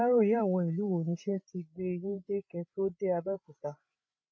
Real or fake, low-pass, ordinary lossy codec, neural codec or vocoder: fake; none; none; codec, 16 kHz, 8 kbps, FreqCodec, larger model